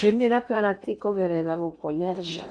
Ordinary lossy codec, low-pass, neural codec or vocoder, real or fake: none; 9.9 kHz; codec, 16 kHz in and 24 kHz out, 0.8 kbps, FocalCodec, streaming, 65536 codes; fake